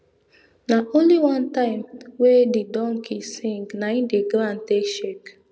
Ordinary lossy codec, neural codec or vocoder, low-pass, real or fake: none; none; none; real